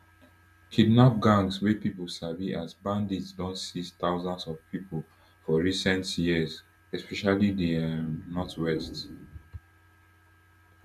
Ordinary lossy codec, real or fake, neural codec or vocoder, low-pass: none; real; none; 14.4 kHz